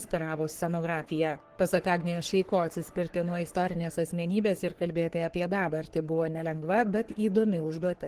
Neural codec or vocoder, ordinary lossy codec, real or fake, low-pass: codec, 44.1 kHz, 3.4 kbps, Pupu-Codec; Opus, 16 kbps; fake; 14.4 kHz